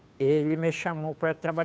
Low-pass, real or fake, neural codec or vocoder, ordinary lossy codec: none; fake; codec, 16 kHz, 2 kbps, FunCodec, trained on Chinese and English, 25 frames a second; none